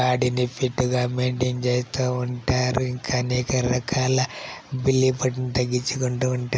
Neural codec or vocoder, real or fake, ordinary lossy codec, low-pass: none; real; none; none